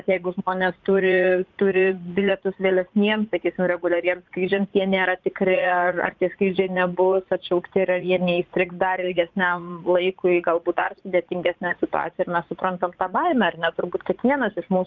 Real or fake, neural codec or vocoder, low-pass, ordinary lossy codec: fake; vocoder, 44.1 kHz, 80 mel bands, Vocos; 7.2 kHz; Opus, 24 kbps